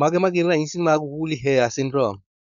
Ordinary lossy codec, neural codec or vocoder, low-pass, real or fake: none; codec, 16 kHz, 4.8 kbps, FACodec; 7.2 kHz; fake